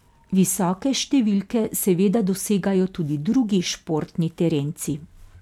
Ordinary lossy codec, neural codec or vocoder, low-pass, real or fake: none; none; 19.8 kHz; real